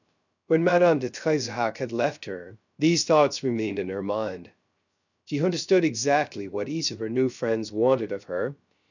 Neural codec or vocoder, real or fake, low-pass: codec, 16 kHz, 0.3 kbps, FocalCodec; fake; 7.2 kHz